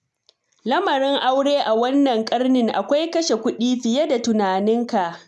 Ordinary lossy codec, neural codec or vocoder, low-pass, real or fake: none; none; none; real